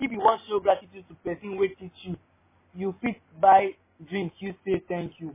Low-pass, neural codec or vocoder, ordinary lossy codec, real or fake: 3.6 kHz; none; MP3, 16 kbps; real